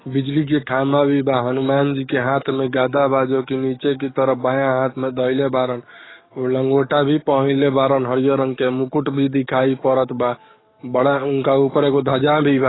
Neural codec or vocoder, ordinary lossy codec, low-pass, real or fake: codec, 44.1 kHz, 7.8 kbps, DAC; AAC, 16 kbps; 7.2 kHz; fake